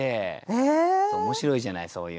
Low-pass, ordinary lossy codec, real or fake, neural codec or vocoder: none; none; real; none